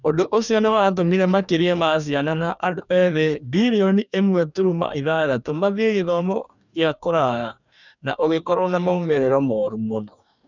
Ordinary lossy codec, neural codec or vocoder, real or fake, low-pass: none; codec, 44.1 kHz, 2.6 kbps, DAC; fake; 7.2 kHz